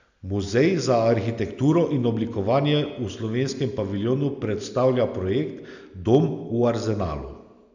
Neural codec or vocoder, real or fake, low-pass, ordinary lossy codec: none; real; 7.2 kHz; none